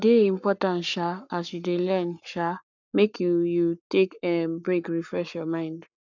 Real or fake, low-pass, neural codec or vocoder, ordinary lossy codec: fake; 7.2 kHz; codec, 44.1 kHz, 7.8 kbps, Pupu-Codec; none